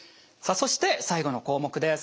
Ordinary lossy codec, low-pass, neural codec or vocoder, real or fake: none; none; none; real